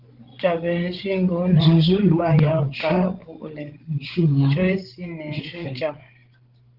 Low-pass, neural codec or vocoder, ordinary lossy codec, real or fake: 5.4 kHz; codec, 16 kHz, 16 kbps, FreqCodec, larger model; Opus, 16 kbps; fake